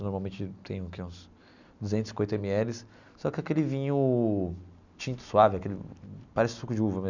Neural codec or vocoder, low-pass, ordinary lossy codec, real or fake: none; 7.2 kHz; none; real